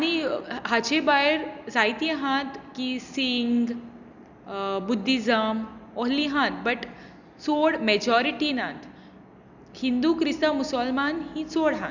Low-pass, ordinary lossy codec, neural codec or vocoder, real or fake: 7.2 kHz; none; none; real